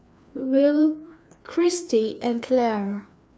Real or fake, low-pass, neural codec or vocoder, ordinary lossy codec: fake; none; codec, 16 kHz, 2 kbps, FreqCodec, larger model; none